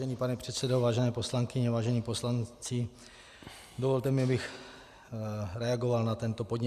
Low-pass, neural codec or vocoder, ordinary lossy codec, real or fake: 14.4 kHz; none; Opus, 64 kbps; real